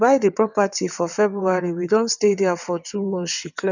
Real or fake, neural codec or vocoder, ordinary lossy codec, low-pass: fake; vocoder, 22.05 kHz, 80 mel bands, WaveNeXt; none; 7.2 kHz